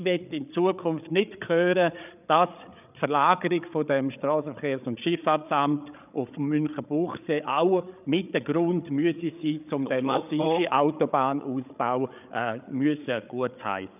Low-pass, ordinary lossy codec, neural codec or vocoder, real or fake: 3.6 kHz; none; codec, 16 kHz, 8 kbps, FreqCodec, larger model; fake